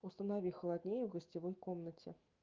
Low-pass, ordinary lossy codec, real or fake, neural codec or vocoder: 7.2 kHz; Opus, 32 kbps; fake; autoencoder, 48 kHz, 128 numbers a frame, DAC-VAE, trained on Japanese speech